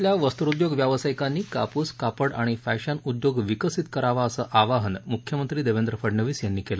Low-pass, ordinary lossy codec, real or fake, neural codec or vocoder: none; none; real; none